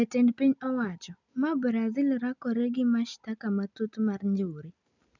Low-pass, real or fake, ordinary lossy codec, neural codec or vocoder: 7.2 kHz; real; none; none